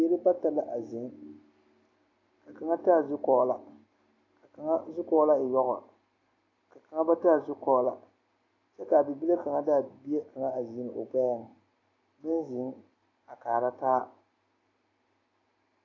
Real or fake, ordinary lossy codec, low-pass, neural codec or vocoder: real; AAC, 32 kbps; 7.2 kHz; none